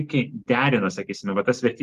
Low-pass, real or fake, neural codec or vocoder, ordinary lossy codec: 14.4 kHz; real; none; Opus, 24 kbps